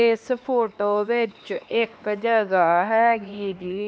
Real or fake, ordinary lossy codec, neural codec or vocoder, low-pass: fake; none; codec, 16 kHz, 2 kbps, X-Codec, HuBERT features, trained on LibriSpeech; none